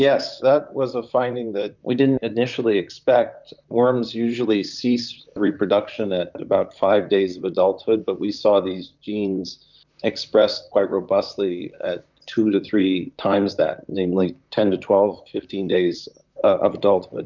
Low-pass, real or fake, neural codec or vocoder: 7.2 kHz; fake; vocoder, 44.1 kHz, 128 mel bands, Pupu-Vocoder